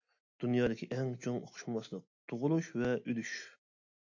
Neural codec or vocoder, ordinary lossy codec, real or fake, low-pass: none; AAC, 48 kbps; real; 7.2 kHz